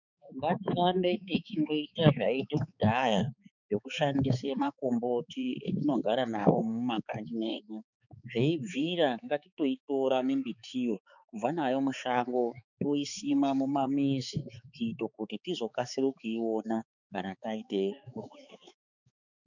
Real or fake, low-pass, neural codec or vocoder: fake; 7.2 kHz; codec, 16 kHz, 4 kbps, X-Codec, HuBERT features, trained on balanced general audio